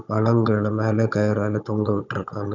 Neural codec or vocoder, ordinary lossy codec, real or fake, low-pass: codec, 16 kHz, 16 kbps, FunCodec, trained on Chinese and English, 50 frames a second; none; fake; 7.2 kHz